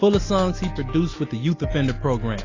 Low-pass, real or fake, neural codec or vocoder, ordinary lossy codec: 7.2 kHz; real; none; AAC, 32 kbps